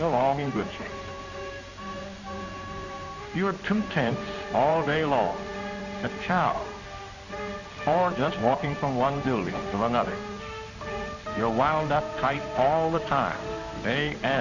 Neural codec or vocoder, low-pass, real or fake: codec, 16 kHz in and 24 kHz out, 1 kbps, XY-Tokenizer; 7.2 kHz; fake